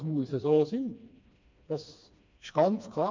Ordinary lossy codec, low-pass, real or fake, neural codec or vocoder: MP3, 48 kbps; 7.2 kHz; fake; codec, 16 kHz, 2 kbps, FreqCodec, smaller model